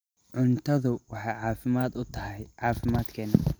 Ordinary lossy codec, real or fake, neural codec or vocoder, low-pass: none; real; none; none